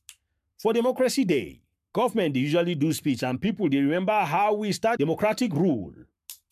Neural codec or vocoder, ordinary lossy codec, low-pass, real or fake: codec, 44.1 kHz, 7.8 kbps, Pupu-Codec; MP3, 96 kbps; 14.4 kHz; fake